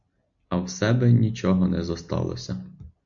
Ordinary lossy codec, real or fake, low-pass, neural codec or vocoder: AAC, 64 kbps; real; 7.2 kHz; none